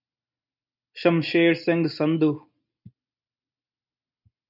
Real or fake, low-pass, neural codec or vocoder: real; 5.4 kHz; none